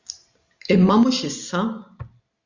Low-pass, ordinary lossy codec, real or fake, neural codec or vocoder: 7.2 kHz; Opus, 64 kbps; real; none